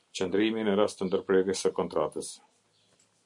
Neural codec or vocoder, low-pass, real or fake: none; 10.8 kHz; real